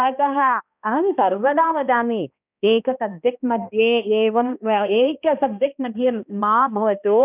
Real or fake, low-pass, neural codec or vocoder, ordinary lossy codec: fake; 3.6 kHz; codec, 16 kHz, 1 kbps, X-Codec, HuBERT features, trained on balanced general audio; none